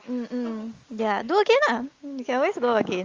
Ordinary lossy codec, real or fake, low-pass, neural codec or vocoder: Opus, 32 kbps; real; 7.2 kHz; none